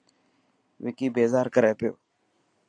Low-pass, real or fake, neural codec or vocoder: 9.9 kHz; real; none